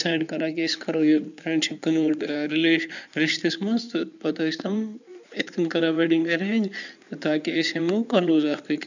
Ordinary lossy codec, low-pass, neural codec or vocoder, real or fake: none; 7.2 kHz; codec, 16 kHz in and 24 kHz out, 2.2 kbps, FireRedTTS-2 codec; fake